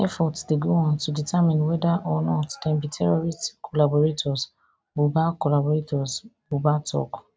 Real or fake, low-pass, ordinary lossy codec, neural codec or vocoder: real; none; none; none